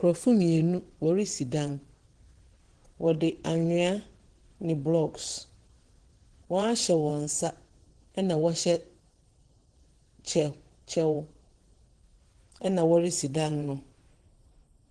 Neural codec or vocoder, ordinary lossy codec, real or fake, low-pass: vocoder, 22.05 kHz, 80 mel bands, WaveNeXt; Opus, 16 kbps; fake; 9.9 kHz